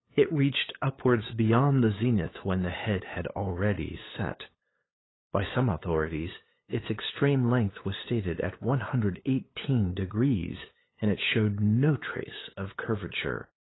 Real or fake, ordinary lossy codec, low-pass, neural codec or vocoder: fake; AAC, 16 kbps; 7.2 kHz; codec, 16 kHz, 8 kbps, FunCodec, trained on LibriTTS, 25 frames a second